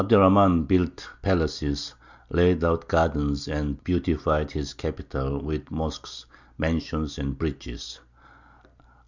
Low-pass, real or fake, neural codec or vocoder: 7.2 kHz; real; none